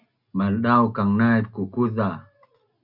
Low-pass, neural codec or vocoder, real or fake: 5.4 kHz; none; real